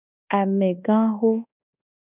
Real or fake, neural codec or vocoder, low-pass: fake; codec, 24 kHz, 1.2 kbps, DualCodec; 3.6 kHz